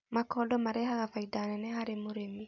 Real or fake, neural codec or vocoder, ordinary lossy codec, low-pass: real; none; none; 7.2 kHz